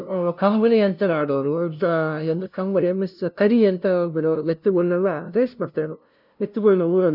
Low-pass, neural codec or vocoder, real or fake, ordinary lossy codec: 5.4 kHz; codec, 16 kHz, 0.5 kbps, FunCodec, trained on LibriTTS, 25 frames a second; fake; none